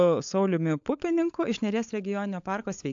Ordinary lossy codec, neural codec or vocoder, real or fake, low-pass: AAC, 64 kbps; codec, 16 kHz, 16 kbps, FunCodec, trained on LibriTTS, 50 frames a second; fake; 7.2 kHz